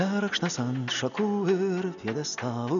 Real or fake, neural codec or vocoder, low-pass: real; none; 7.2 kHz